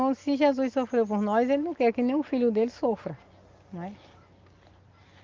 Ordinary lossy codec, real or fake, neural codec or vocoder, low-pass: Opus, 24 kbps; real; none; 7.2 kHz